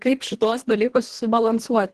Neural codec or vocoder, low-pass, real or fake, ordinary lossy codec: codec, 24 kHz, 1.5 kbps, HILCodec; 10.8 kHz; fake; Opus, 16 kbps